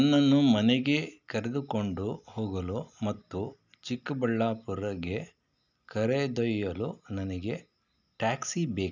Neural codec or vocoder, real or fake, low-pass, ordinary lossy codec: none; real; 7.2 kHz; none